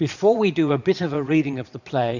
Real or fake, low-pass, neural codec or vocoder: fake; 7.2 kHz; vocoder, 22.05 kHz, 80 mel bands, Vocos